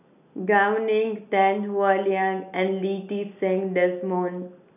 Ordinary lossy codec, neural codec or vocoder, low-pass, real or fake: none; none; 3.6 kHz; real